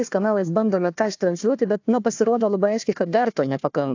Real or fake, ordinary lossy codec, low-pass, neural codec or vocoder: fake; AAC, 48 kbps; 7.2 kHz; codec, 16 kHz, 1 kbps, FunCodec, trained on Chinese and English, 50 frames a second